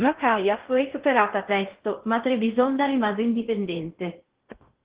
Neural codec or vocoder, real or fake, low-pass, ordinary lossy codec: codec, 16 kHz in and 24 kHz out, 0.6 kbps, FocalCodec, streaming, 4096 codes; fake; 3.6 kHz; Opus, 16 kbps